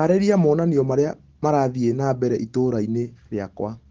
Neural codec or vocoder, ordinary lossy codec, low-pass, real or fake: none; Opus, 16 kbps; 7.2 kHz; real